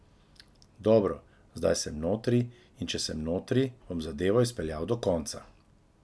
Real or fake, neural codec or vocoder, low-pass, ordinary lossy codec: real; none; none; none